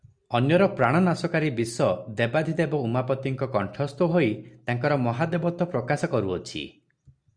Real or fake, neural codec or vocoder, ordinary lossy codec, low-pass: real; none; Opus, 64 kbps; 9.9 kHz